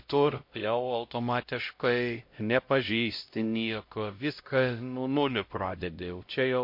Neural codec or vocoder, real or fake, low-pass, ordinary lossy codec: codec, 16 kHz, 0.5 kbps, X-Codec, HuBERT features, trained on LibriSpeech; fake; 5.4 kHz; MP3, 32 kbps